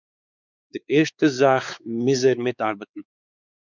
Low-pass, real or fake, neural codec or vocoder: 7.2 kHz; fake; codec, 16 kHz, 4 kbps, X-Codec, WavLM features, trained on Multilingual LibriSpeech